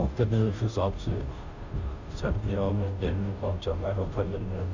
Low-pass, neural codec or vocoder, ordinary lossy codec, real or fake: 7.2 kHz; codec, 16 kHz, 0.5 kbps, FunCodec, trained on Chinese and English, 25 frames a second; none; fake